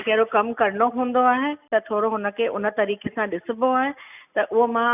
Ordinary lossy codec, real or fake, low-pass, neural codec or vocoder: none; real; 3.6 kHz; none